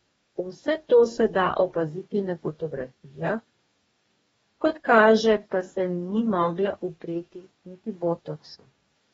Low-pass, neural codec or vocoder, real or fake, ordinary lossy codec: 19.8 kHz; codec, 44.1 kHz, 2.6 kbps, DAC; fake; AAC, 24 kbps